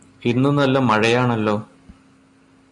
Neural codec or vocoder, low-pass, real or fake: none; 10.8 kHz; real